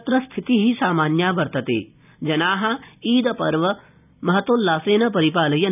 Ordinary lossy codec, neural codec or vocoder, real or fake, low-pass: none; none; real; 3.6 kHz